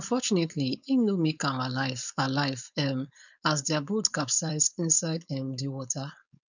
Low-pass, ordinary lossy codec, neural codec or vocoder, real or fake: 7.2 kHz; none; codec, 16 kHz, 4.8 kbps, FACodec; fake